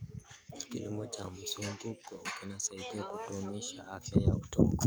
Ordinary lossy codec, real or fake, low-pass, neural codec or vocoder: none; fake; none; codec, 44.1 kHz, 7.8 kbps, DAC